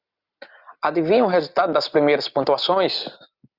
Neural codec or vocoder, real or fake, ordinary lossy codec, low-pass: none; real; Opus, 64 kbps; 5.4 kHz